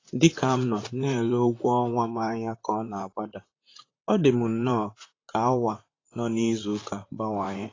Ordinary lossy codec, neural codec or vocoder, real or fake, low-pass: AAC, 32 kbps; vocoder, 44.1 kHz, 128 mel bands, Pupu-Vocoder; fake; 7.2 kHz